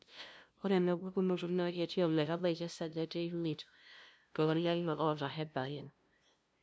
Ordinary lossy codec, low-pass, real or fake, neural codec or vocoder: none; none; fake; codec, 16 kHz, 0.5 kbps, FunCodec, trained on LibriTTS, 25 frames a second